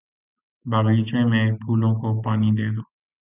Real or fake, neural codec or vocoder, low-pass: real; none; 3.6 kHz